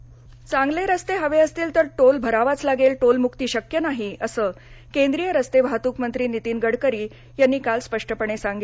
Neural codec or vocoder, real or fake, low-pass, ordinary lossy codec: none; real; none; none